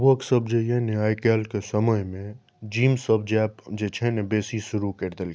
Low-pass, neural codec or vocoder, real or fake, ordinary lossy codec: none; none; real; none